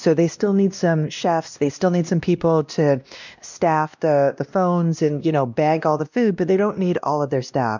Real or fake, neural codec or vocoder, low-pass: fake; codec, 16 kHz, 1 kbps, X-Codec, WavLM features, trained on Multilingual LibriSpeech; 7.2 kHz